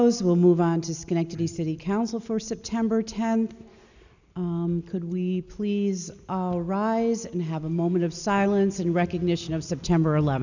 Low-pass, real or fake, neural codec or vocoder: 7.2 kHz; real; none